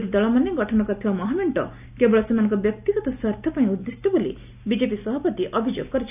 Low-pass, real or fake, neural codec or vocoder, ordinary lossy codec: 3.6 kHz; real; none; none